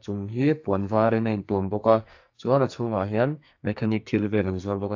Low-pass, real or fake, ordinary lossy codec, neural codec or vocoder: 7.2 kHz; fake; AAC, 48 kbps; codec, 44.1 kHz, 2.6 kbps, SNAC